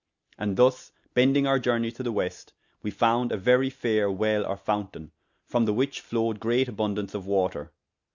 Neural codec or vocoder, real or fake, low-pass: none; real; 7.2 kHz